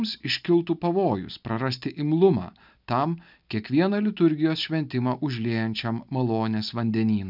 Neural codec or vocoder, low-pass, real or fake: none; 5.4 kHz; real